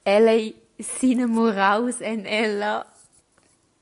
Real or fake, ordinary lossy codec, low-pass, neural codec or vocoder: real; AAC, 96 kbps; 10.8 kHz; none